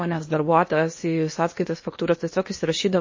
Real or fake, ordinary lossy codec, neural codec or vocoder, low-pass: fake; MP3, 32 kbps; codec, 16 kHz in and 24 kHz out, 0.8 kbps, FocalCodec, streaming, 65536 codes; 7.2 kHz